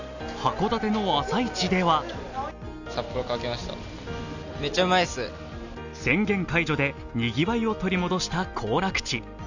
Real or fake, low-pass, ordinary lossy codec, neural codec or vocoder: real; 7.2 kHz; none; none